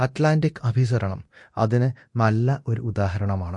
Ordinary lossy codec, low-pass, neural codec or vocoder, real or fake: MP3, 48 kbps; 10.8 kHz; codec, 24 kHz, 0.9 kbps, DualCodec; fake